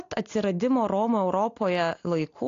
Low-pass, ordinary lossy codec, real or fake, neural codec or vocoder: 7.2 kHz; AAC, 48 kbps; real; none